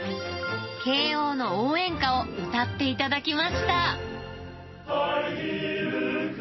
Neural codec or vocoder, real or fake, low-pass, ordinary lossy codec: none; real; 7.2 kHz; MP3, 24 kbps